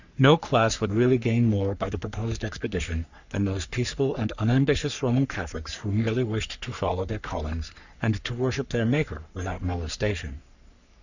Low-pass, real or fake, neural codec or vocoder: 7.2 kHz; fake; codec, 44.1 kHz, 3.4 kbps, Pupu-Codec